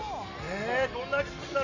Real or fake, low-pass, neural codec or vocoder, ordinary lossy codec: real; 7.2 kHz; none; none